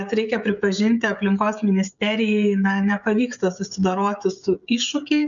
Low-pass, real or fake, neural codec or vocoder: 7.2 kHz; fake; codec, 16 kHz, 16 kbps, FreqCodec, smaller model